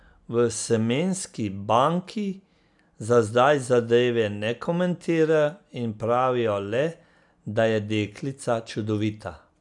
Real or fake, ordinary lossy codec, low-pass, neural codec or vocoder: real; none; 10.8 kHz; none